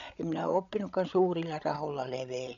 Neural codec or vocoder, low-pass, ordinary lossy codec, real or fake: codec, 16 kHz, 16 kbps, FreqCodec, larger model; 7.2 kHz; none; fake